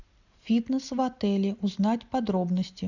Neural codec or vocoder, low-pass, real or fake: none; 7.2 kHz; real